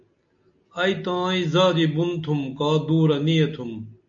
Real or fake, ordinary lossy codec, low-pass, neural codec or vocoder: real; AAC, 64 kbps; 7.2 kHz; none